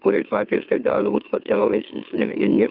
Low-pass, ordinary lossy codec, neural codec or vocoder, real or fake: 5.4 kHz; Opus, 24 kbps; autoencoder, 44.1 kHz, a latent of 192 numbers a frame, MeloTTS; fake